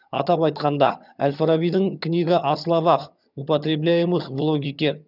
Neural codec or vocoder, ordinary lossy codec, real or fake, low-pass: vocoder, 22.05 kHz, 80 mel bands, HiFi-GAN; none; fake; 5.4 kHz